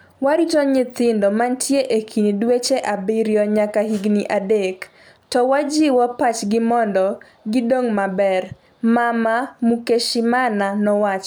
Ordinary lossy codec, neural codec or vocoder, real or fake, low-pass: none; none; real; none